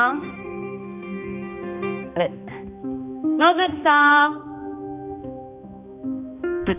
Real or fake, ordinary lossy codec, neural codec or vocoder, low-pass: fake; none; codec, 16 kHz, 2 kbps, X-Codec, HuBERT features, trained on balanced general audio; 3.6 kHz